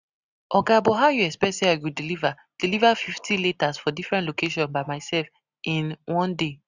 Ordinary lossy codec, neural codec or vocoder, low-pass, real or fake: none; none; 7.2 kHz; real